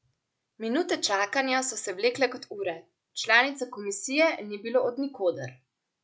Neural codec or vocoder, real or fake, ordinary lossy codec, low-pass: none; real; none; none